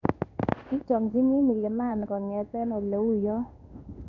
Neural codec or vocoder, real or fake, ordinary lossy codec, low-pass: codec, 16 kHz in and 24 kHz out, 1 kbps, XY-Tokenizer; fake; none; 7.2 kHz